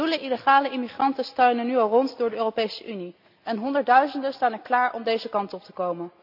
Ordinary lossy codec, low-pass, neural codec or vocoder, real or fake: none; 5.4 kHz; none; real